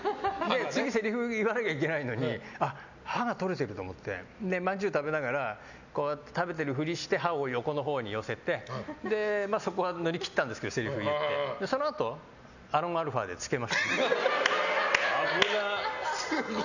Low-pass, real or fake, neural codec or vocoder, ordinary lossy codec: 7.2 kHz; real; none; none